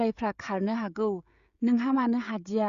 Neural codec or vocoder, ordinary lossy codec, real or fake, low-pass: codec, 16 kHz, 16 kbps, FreqCodec, smaller model; Opus, 64 kbps; fake; 7.2 kHz